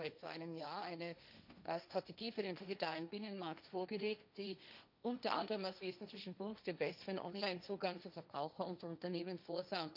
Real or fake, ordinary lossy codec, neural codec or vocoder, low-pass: fake; none; codec, 16 kHz, 1.1 kbps, Voila-Tokenizer; 5.4 kHz